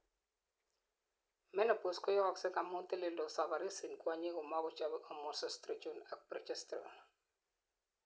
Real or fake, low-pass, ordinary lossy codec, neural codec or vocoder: real; none; none; none